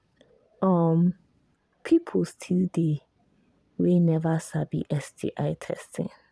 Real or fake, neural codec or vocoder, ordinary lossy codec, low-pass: fake; vocoder, 22.05 kHz, 80 mel bands, Vocos; none; none